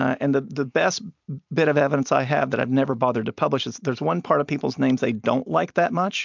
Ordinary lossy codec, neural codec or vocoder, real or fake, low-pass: MP3, 64 kbps; none; real; 7.2 kHz